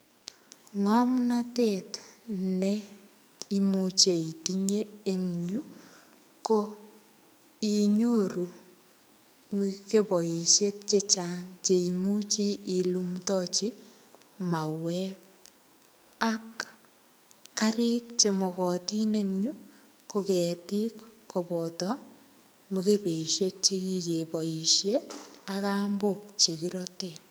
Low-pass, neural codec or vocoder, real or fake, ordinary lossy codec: none; codec, 44.1 kHz, 2.6 kbps, SNAC; fake; none